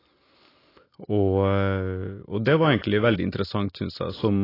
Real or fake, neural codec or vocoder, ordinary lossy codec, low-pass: real; none; AAC, 24 kbps; 5.4 kHz